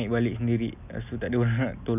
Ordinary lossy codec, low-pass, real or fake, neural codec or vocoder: none; 3.6 kHz; real; none